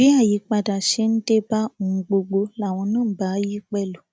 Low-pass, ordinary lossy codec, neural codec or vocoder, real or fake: none; none; none; real